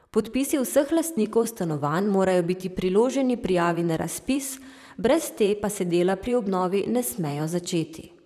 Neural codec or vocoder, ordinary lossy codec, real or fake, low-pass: vocoder, 44.1 kHz, 128 mel bands, Pupu-Vocoder; none; fake; 14.4 kHz